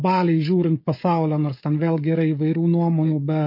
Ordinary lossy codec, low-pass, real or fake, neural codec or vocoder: MP3, 32 kbps; 5.4 kHz; fake; codec, 16 kHz, 16 kbps, FreqCodec, smaller model